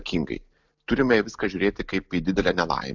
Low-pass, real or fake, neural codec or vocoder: 7.2 kHz; real; none